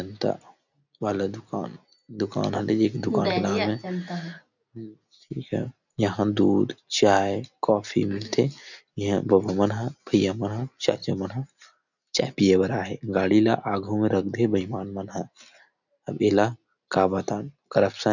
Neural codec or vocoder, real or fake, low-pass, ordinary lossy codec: none; real; 7.2 kHz; none